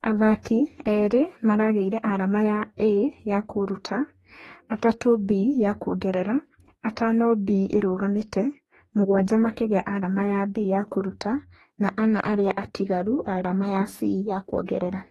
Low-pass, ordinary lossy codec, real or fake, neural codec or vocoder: 19.8 kHz; AAC, 32 kbps; fake; codec, 44.1 kHz, 2.6 kbps, DAC